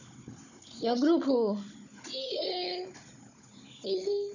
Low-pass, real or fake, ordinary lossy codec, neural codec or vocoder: 7.2 kHz; fake; none; codec, 16 kHz, 16 kbps, FunCodec, trained on Chinese and English, 50 frames a second